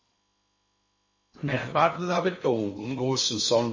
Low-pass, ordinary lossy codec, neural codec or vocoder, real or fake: 7.2 kHz; MP3, 32 kbps; codec, 16 kHz in and 24 kHz out, 0.8 kbps, FocalCodec, streaming, 65536 codes; fake